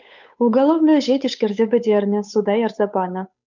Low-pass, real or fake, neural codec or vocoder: 7.2 kHz; fake; codec, 16 kHz, 8 kbps, FunCodec, trained on Chinese and English, 25 frames a second